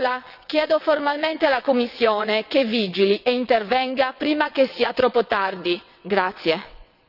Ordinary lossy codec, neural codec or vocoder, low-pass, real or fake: none; vocoder, 22.05 kHz, 80 mel bands, WaveNeXt; 5.4 kHz; fake